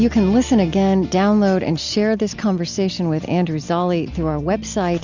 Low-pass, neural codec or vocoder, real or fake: 7.2 kHz; none; real